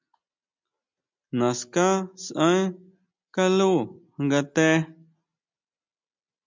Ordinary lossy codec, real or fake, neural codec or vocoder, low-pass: MP3, 64 kbps; real; none; 7.2 kHz